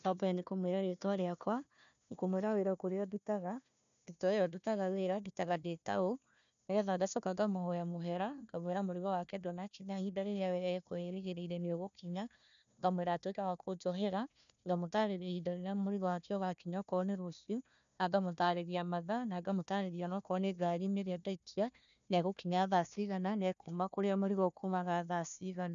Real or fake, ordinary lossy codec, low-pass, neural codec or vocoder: fake; none; 7.2 kHz; codec, 16 kHz, 1 kbps, FunCodec, trained on Chinese and English, 50 frames a second